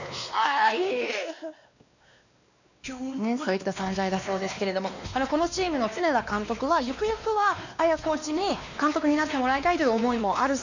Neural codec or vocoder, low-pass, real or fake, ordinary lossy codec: codec, 16 kHz, 2 kbps, X-Codec, WavLM features, trained on Multilingual LibriSpeech; 7.2 kHz; fake; none